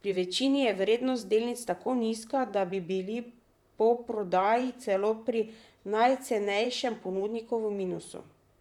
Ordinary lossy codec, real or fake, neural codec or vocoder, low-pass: none; fake; vocoder, 44.1 kHz, 128 mel bands, Pupu-Vocoder; 19.8 kHz